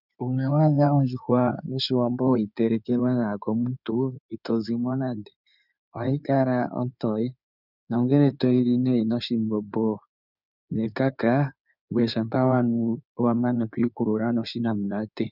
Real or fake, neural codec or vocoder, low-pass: fake; codec, 16 kHz in and 24 kHz out, 2.2 kbps, FireRedTTS-2 codec; 5.4 kHz